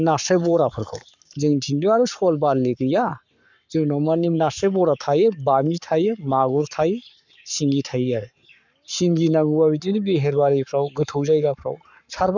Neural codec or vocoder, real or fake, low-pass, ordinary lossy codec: codec, 44.1 kHz, 7.8 kbps, Pupu-Codec; fake; 7.2 kHz; none